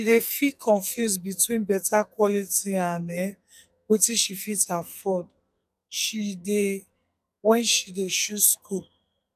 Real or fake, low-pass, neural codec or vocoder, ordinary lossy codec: fake; 14.4 kHz; codec, 44.1 kHz, 2.6 kbps, SNAC; none